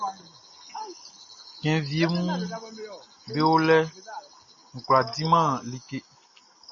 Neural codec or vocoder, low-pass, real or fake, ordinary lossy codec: none; 7.2 kHz; real; MP3, 32 kbps